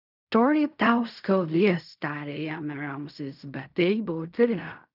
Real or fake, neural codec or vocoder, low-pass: fake; codec, 16 kHz in and 24 kHz out, 0.4 kbps, LongCat-Audio-Codec, fine tuned four codebook decoder; 5.4 kHz